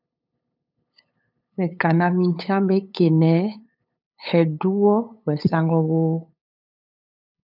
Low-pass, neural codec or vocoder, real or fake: 5.4 kHz; codec, 16 kHz, 8 kbps, FunCodec, trained on LibriTTS, 25 frames a second; fake